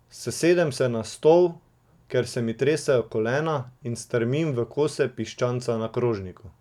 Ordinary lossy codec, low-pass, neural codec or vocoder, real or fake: none; 19.8 kHz; none; real